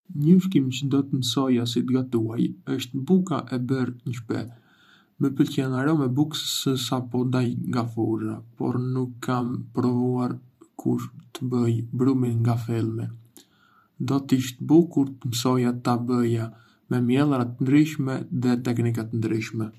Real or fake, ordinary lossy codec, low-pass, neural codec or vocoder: fake; none; 14.4 kHz; vocoder, 44.1 kHz, 128 mel bands every 512 samples, BigVGAN v2